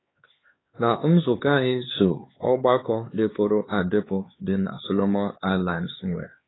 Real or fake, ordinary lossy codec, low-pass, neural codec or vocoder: fake; AAC, 16 kbps; 7.2 kHz; codec, 16 kHz, 4 kbps, X-Codec, HuBERT features, trained on LibriSpeech